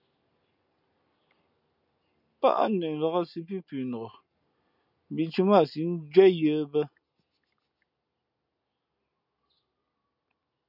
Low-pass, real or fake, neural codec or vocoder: 5.4 kHz; real; none